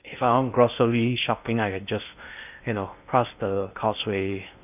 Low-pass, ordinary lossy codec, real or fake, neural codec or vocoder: 3.6 kHz; none; fake; codec, 16 kHz in and 24 kHz out, 0.6 kbps, FocalCodec, streaming, 2048 codes